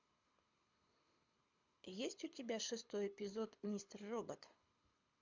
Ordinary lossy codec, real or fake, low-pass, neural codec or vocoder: MP3, 64 kbps; fake; 7.2 kHz; codec, 24 kHz, 6 kbps, HILCodec